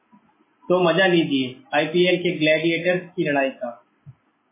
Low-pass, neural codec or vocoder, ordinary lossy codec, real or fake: 3.6 kHz; none; MP3, 16 kbps; real